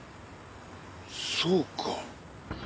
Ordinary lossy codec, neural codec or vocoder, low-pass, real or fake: none; none; none; real